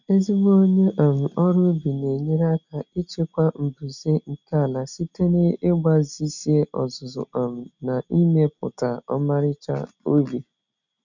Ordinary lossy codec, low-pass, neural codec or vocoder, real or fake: none; 7.2 kHz; none; real